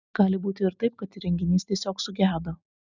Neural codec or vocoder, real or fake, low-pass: none; real; 7.2 kHz